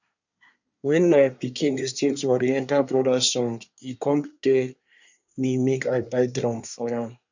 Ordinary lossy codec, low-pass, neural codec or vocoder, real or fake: none; 7.2 kHz; codec, 24 kHz, 1 kbps, SNAC; fake